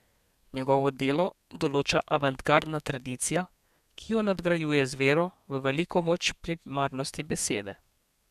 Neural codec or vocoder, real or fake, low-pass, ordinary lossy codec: codec, 32 kHz, 1.9 kbps, SNAC; fake; 14.4 kHz; none